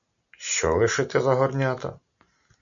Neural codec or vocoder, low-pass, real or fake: none; 7.2 kHz; real